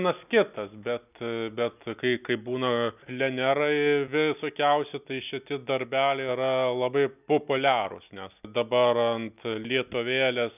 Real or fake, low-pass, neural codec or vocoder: real; 3.6 kHz; none